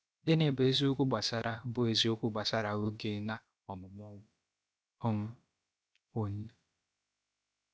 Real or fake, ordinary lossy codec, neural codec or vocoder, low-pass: fake; none; codec, 16 kHz, about 1 kbps, DyCAST, with the encoder's durations; none